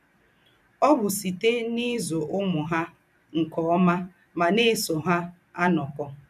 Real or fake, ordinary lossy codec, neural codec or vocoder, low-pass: fake; none; vocoder, 44.1 kHz, 128 mel bands every 256 samples, BigVGAN v2; 14.4 kHz